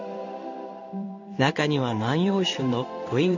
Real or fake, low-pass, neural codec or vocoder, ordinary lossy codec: fake; 7.2 kHz; codec, 16 kHz in and 24 kHz out, 1 kbps, XY-Tokenizer; none